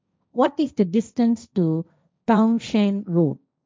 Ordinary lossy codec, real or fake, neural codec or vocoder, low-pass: none; fake; codec, 16 kHz, 1.1 kbps, Voila-Tokenizer; none